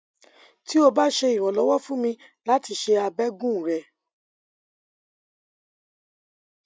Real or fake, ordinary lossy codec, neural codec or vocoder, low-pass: real; none; none; none